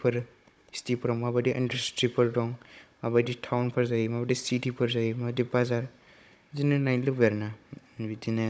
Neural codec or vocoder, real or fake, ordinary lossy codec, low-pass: codec, 16 kHz, 16 kbps, FunCodec, trained on Chinese and English, 50 frames a second; fake; none; none